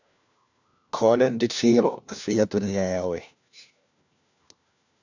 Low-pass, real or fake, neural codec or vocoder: 7.2 kHz; fake; codec, 16 kHz, 1 kbps, FunCodec, trained on LibriTTS, 50 frames a second